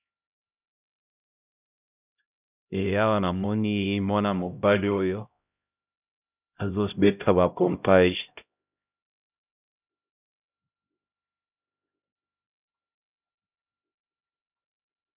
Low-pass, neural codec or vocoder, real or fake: 3.6 kHz; codec, 16 kHz, 0.5 kbps, X-Codec, HuBERT features, trained on LibriSpeech; fake